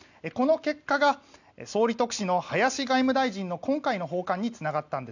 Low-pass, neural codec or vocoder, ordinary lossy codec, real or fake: 7.2 kHz; none; none; real